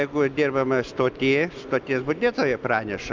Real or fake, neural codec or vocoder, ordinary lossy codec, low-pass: real; none; Opus, 24 kbps; 7.2 kHz